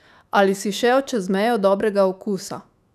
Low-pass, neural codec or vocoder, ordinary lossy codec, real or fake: 14.4 kHz; autoencoder, 48 kHz, 128 numbers a frame, DAC-VAE, trained on Japanese speech; none; fake